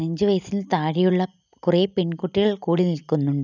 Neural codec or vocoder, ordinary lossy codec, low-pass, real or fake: none; none; 7.2 kHz; real